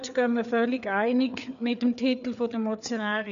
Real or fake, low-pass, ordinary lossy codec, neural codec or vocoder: fake; 7.2 kHz; none; codec, 16 kHz, 4 kbps, FreqCodec, larger model